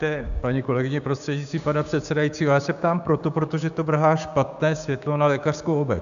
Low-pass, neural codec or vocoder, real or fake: 7.2 kHz; codec, 16 kHz, 6 kbps, DAC; fake